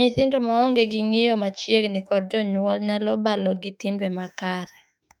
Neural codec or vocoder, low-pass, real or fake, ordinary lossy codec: autoencoder, 48 kHz, 32 numbers a frame, DAC-VAE, trained on Japanese speech; 19.8 kHz; fake; none